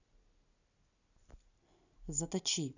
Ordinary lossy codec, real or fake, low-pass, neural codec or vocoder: none; real; 7.2 kHz; none